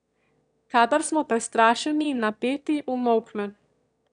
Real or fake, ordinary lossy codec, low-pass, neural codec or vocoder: fake; none; 9.9 kHz; autoencoder, 22.05 kHz, a latent of 192 numbers a frame, VITS, trained on one speaker